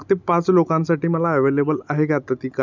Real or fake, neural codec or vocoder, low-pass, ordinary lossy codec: real; none; 7.2 kHz; none